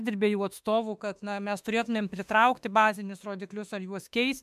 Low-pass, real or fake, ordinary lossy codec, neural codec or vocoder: 14.4 kHz; fake; MP3, 96 kbps; autoencoder, 48 kHz, 32 numbers a frame, DAC-VAE, trained on Japanese speech